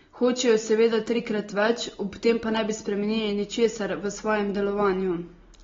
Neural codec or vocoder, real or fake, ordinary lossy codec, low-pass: none; real; AAC, 24 kbps; 7.2 kHz